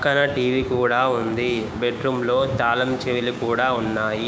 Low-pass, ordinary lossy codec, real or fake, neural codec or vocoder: none; none; fake; codec, 16 kHz, 6 kbps, DAC